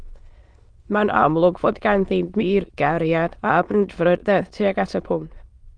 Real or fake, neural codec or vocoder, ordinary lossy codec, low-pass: fake; autoencoder, 22.05 kHz, a latent of 192 numbers a frame, VITS, trained on many speakers; Opus, 32 kbps; 9.9 kHz